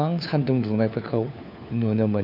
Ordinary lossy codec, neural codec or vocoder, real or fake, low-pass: none; codec, 16 kHz, 4 kbps, FunCodec, trained on LibriTTS, 50 frames a second; fake; 5.4 kHz